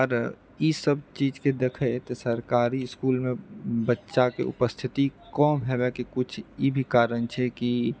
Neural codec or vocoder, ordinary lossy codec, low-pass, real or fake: none; none; none; real